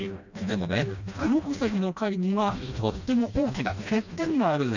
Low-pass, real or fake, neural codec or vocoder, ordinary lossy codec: 7.2 kHz; fake; codec, 16 kHz, 1 kbps, FreqCodec, smaller model; none